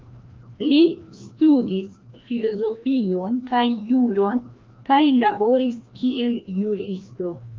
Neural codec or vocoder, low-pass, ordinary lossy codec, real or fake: codec, 16 kHz, 1 kbps, FreqCodec, larger model; 7.2 kHz; Opus, 32 kbps; fake